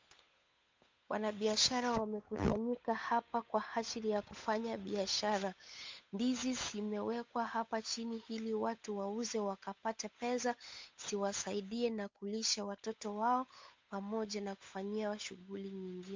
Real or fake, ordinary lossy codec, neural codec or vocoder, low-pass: fake; MP3, 48 kbps; codec, 16 kHz, 8 kbps, FunCodec, trained on Chinese and English, 25 frames a second; 7.2 kHz